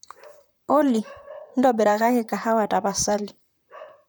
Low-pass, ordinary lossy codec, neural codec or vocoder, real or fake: none; none; vocoder, 44.1 kHz, 128 mel bands, Pupu-Vocoder; fake